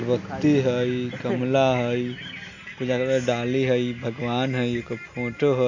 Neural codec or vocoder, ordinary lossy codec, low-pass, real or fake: none; AAC, 48 kbps; 7.2 kHz; real